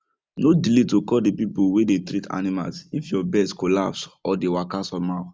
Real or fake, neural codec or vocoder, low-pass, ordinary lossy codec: real; none; none; none